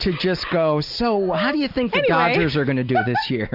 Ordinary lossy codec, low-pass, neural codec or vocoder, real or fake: Opus, 64 kbps; 5.4 kHz; none; real